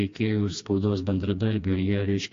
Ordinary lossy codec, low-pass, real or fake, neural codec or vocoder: AAC, 48 kbps; 7.2 kHz; fake; codec, 16 kHz, 2 kbps, FreqCodec, smaller model